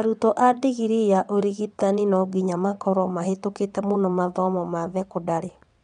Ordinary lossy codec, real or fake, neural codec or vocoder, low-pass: none; fake; vocoder, 22.05 kHz, 80 mel bands, WaveNeXt; 9.9 kHz